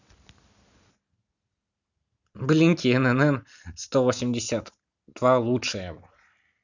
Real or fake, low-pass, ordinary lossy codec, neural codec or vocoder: real; 7.2 kHz; none; none